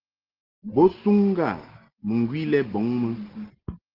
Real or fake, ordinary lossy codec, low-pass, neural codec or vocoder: real; Opus, 16 kbps; 5.4 kHz; none